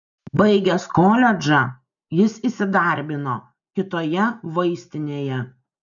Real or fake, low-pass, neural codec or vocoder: real; 7.2 kHz; none